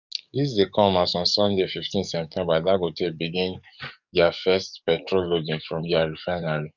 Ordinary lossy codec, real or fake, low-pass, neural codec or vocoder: none; fake; 7.2 kHz; codec, 44.1 kHz, 7.8 kbps, DAC